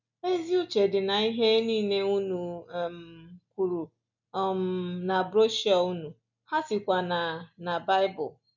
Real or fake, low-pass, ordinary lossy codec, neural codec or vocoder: real; 7.2 kHz; none; none